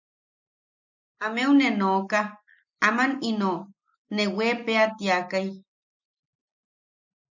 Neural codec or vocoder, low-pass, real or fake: none; 7.2 kHz; real